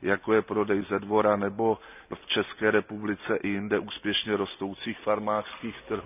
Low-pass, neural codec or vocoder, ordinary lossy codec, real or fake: 3.6 kHz; none; none; real